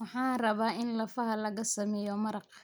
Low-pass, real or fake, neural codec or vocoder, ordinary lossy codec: none; real; none; none